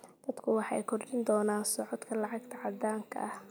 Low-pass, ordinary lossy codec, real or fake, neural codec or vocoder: none; none; real; none